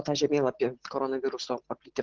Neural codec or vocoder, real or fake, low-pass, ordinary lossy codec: none; real; 7.2 kHz; Opus, 16 kbps